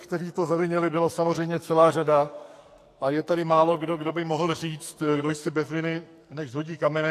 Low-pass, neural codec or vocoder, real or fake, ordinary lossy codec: 14.4 kHz; codec, 44.1 kHz, 2.6 kbps, SNAC; fake; AAC, 64 kbps